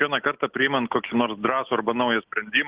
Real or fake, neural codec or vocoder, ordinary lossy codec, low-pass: real; none; Opus, 16 kbps; 3.6 kHz